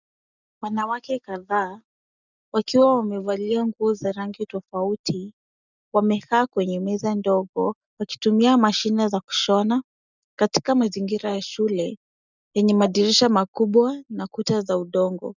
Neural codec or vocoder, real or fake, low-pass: none; real; 7.2 kHz